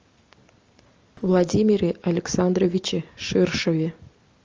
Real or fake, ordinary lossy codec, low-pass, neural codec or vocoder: real; Opus, 24 kbps; 7.2 kHz; none